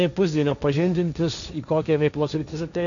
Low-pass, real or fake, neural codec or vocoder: 7.2 kHz; fake; codec, 16 kHz, 1.1 kbps, Voila-Tokenizer